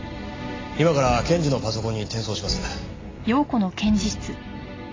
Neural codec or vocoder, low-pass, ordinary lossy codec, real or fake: none; 7.2 kHz; AAC, 32 kbps; real